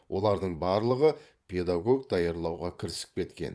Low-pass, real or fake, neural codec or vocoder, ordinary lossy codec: none; fake; vocoder, 22.05 kHz, 80 mel bands, Vocos; none